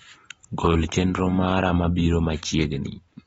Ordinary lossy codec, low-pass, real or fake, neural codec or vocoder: AAC, 24 kbps; 19.8 kHz; fake; vocoder, 44.1 kHz, 128 mel bands every 256 samples, BigVGAN v2